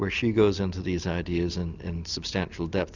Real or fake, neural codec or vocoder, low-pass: real; none; 7.2 kHz